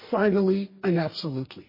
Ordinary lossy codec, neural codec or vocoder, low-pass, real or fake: MP3, 24 kbps; codec, 44.1 kHz, 2.6 kbps, DAC; 5.4 kHz; fake